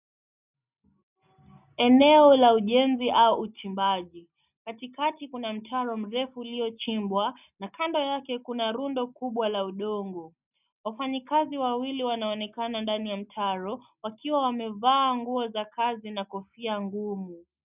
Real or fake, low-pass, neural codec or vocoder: real; 3.6 kHz; none